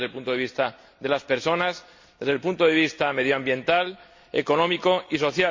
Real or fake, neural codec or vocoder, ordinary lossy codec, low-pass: real; none; MP3, 48 kbps; 7.2 kHz